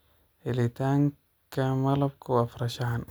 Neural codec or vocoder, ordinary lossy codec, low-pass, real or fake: none; none; none; real